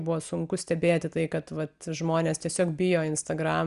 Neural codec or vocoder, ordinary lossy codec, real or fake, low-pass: none; Opus, 64 kbps; real; 10.8 kHz